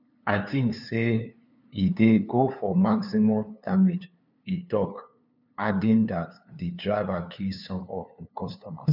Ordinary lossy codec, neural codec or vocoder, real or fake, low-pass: none; codec, 16 kHz, 2 kbps, FunCodec, trained on LibriTTS, 25 frames a second; fake; 5.4 kHz